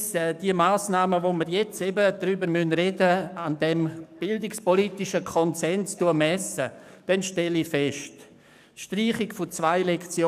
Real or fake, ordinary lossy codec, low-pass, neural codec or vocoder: fake; none; 14.4 kHz; codec, 44.1 kHz, 7.8 kbps, DAC